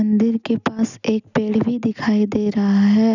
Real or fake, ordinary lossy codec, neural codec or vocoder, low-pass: real; none; none; 7.2 kHz